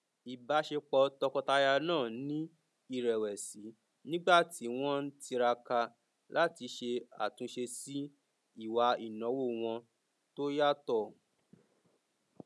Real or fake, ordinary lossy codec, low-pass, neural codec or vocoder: real; none; none; none